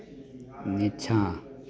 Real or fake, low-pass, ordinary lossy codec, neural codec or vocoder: real; none; none; none